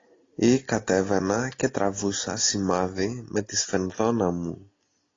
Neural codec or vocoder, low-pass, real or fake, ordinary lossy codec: none; 7.2 kHz; real; AAC, 32 kbps